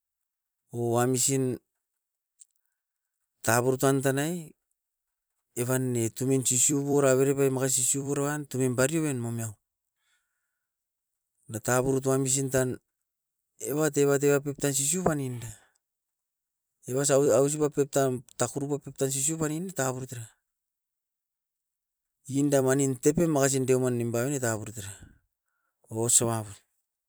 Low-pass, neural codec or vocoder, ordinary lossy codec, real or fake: none; vocoder, 44.1 kHz, 128 mel bands every 256 samples, BigVGAN v2; none; fake